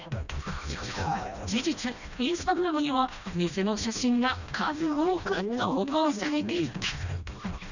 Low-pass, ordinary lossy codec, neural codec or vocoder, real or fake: 7.2 kHz; none; codec, 16 kHz, 1 kbps, FreqCodec, smaller model; fake